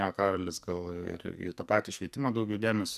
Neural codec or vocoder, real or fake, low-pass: codec, 44.1 kHz, 2.6 kbps, SNAC; fake; 14.4 kHz